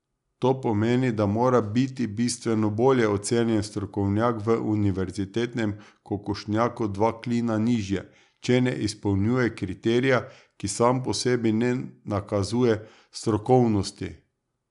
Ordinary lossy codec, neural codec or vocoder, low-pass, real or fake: none; none; 10.8 kHz; real